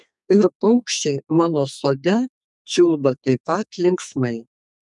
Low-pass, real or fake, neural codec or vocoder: 10.8 kHz; fake; codec, 32 kHz, 1.9 kbps, SNAC